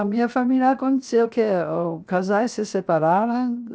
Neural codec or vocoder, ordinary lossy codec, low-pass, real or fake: codec, 16 kHz, 0.7 kbps, FocalCodec; none; none; fake